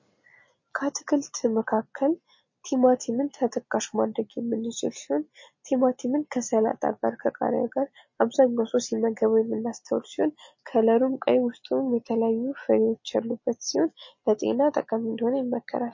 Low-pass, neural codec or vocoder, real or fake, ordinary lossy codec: 7.2 kHz; none; real; MP3, 32 kbps